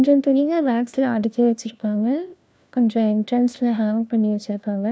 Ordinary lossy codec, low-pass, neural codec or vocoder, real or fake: none; none; codec, 16 kHz, 1 kbps, FunCodec, trained on LibriTTS, 50 frames a second; fake